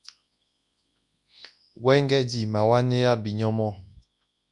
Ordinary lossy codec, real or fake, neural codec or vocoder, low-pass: Opus, 64 kbps; fake; codec, 24 kHz, 0.9 kbps, WavTokenizer, large speech release; 10.8 kHz